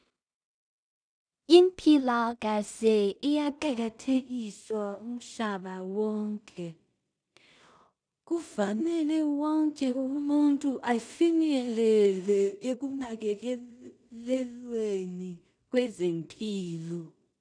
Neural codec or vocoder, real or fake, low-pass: codec, 16 kHz in and 24 kHz out, 0.4 kbps, LongCat-Audio-Codec, two codebook decoder; fake; 9.9 kHz